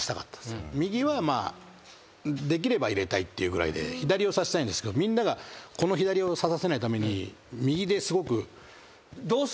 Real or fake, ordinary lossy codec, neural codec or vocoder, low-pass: real; none; none; none